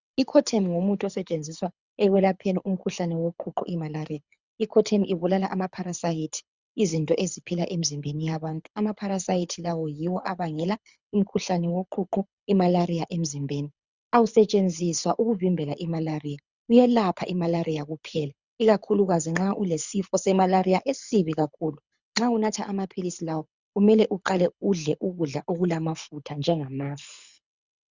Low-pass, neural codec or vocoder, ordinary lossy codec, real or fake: 7.2 kHz; codec, 24 kHz, 6 kbps, HILCodec; Opus, 64 kbps; fake